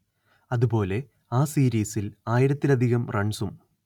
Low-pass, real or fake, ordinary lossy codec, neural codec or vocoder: 19.8 kHz; real; none; none